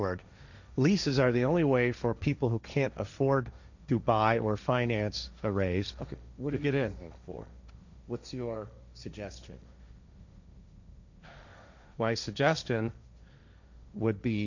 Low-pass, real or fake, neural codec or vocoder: 7.2 kHz; fake; codec, 16 kHz, 1.1 kbps, Voila-Tokenizer